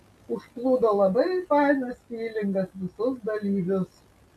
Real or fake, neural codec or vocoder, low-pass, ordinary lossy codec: real; none; 14.4 kHz; MP3, 96 kbps